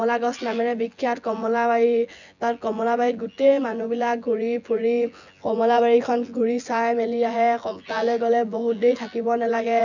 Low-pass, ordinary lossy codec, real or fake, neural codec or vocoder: 7.2 kHz; none; fake; vocoder, 24 kHz, 100 mel bands, Vocos